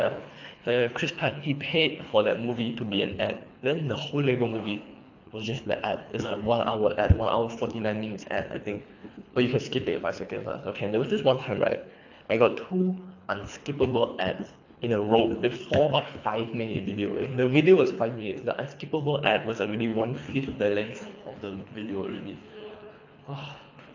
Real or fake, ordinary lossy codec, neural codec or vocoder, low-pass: fake; AAC, 48 kbps; codec, 24 kHz, 3 kbps, HILCodec; 7.2 kHz